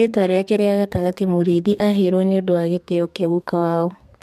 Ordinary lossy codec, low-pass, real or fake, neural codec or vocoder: MP3, 96 kbps; 14.4 kHz; fake; codec, 32 kHz, 1.9 kbps, SNAC